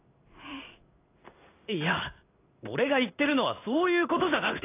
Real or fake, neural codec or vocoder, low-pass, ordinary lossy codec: fake; codec, 16 kHz in and 24 kHz out, 1 kbps, XY-Tokenizer; 3.6 kHz; AAC, 24 kbps